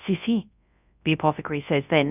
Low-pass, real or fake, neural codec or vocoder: 3.6 kHz; fake; codec, 24 kHz, 0.9 kbps, WavTokenizer, large speech release